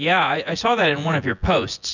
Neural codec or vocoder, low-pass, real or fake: vocoder, 24 kHz, 100 mel bands, Vocos; 7.2 kHz; fake